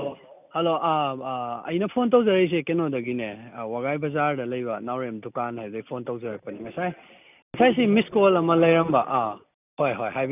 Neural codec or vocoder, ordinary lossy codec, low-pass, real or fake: none; none; 3.6 kHz; real